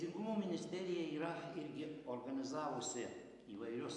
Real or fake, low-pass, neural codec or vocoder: real; 10.8 kHz; none